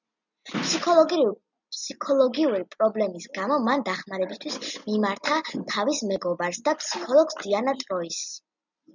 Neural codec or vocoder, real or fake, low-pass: none; real; 7.2 kHz